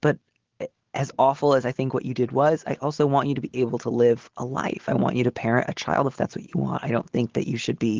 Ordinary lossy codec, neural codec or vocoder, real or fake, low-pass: Opus, 16 kbps; none; real; 7.2 kHz